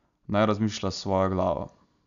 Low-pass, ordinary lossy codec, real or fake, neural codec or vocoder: 7.2 kHz; none; real; none